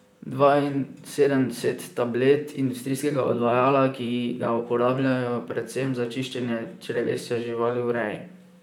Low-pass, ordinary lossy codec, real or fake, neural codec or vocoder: 19.8 kHz; none; fake; vocoder, 44.1 kHz, 128 mel bands, Pupu-Vocoder